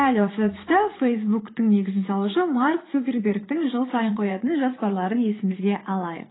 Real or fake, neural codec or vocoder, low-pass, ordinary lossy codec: fake; vocoder, 44.1 kHz, 80 mel bands, Vocos; 7.2 kHz; AAC, 16 kbps